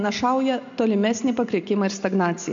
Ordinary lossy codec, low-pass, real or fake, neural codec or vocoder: MP3, 48 kbps; 7.2 kHz; real; none